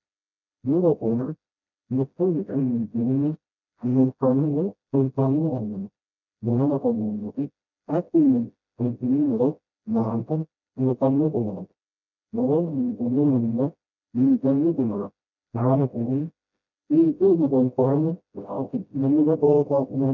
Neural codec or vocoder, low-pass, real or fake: codec, 16 kHz, 0.5 kbps, FreqCodec, smaller model; 7.2 kHz; fake